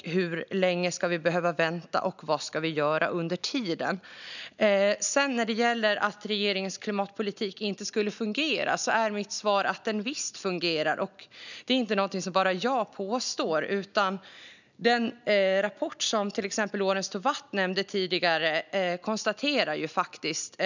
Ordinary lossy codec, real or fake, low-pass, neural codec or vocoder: none; real; 7.2 kHz; none